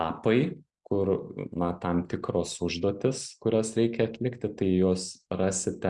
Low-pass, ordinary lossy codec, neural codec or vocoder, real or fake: 10.8 kHz; Opus, 64 kbps; none; real